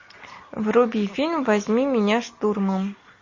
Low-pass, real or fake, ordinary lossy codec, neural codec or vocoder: 7.2 kHz; real; MP3, 32 kbps; none